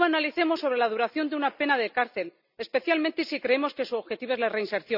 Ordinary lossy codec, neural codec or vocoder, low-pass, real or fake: none; none; 5.4 kHz; real